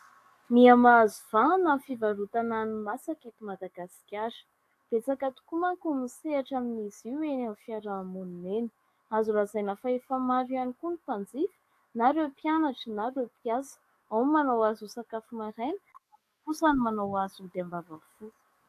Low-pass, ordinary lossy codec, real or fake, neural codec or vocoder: 14.4 kHz; AAC, 64 kbps; fake; codec, 44.1 kHz, 7.8 kbps, DAC